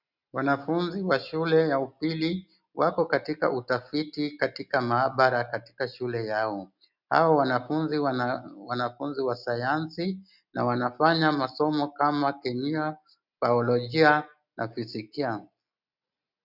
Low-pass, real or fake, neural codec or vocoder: 5.4 kHz; real; none